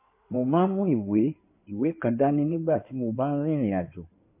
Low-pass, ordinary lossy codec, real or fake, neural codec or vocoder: 3.6 kHz; MP3, 24 kbps; fake; codec, 16 kHz in and 24 kHz out, 2.2 kbps, FireRedTTS-2 codec